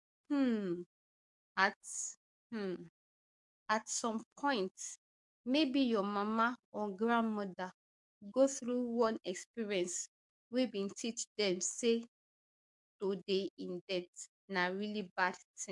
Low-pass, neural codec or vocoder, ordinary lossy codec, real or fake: 10.8 kHz; codec, 44.1 kHz, 7.8 kbps, DAC; MP3, 64 kbps; fake